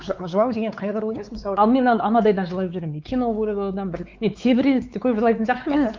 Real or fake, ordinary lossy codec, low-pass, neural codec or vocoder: fake; Opus, 24 kbps; 7.2 kHz; codec, 16 kHz, 4 kbps, X-Codec, WavLM features, trained on Multilingual LibriSpeech